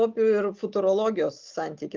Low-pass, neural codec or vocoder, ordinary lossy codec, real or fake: 7.2 kHz; none; Opus, 32 kbps; real